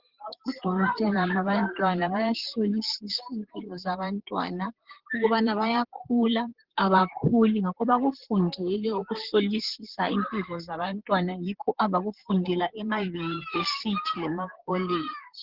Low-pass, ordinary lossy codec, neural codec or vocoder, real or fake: 5.4 kHz; Opus, 16 kbps; vocoder, 44.1 kHz, 128 mel bands, Pupu-Vocoder; fake